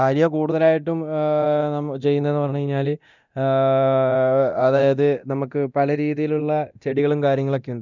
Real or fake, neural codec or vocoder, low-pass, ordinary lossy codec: fake; codec, 24 kHz, 0.9 kbps, DualCodec; 7.2 kHz; none